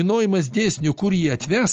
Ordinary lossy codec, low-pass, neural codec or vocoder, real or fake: Opus, 24 kbps; 7.2 kHz; none; real